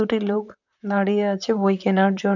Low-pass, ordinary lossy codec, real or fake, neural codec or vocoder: 7.2 kHz; none; fake; vocoder, 44.1 kHz, 128 mel bands, Pupu-Vocoder